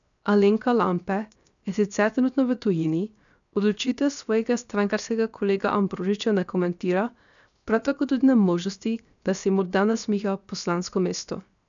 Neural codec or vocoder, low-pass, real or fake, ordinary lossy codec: codec, 16 kHz, 0.7 kbps, FocalCodec; 7.2 kHz; fake; none